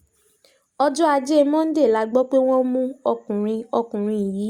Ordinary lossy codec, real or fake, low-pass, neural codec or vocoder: Opus, 64 kbps; real; 19.8 kHz; none